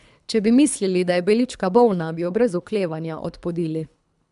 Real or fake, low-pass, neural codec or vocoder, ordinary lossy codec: fake; 10.8 kHz; codec, 24 kHz, 3 kbps, HILCodec; none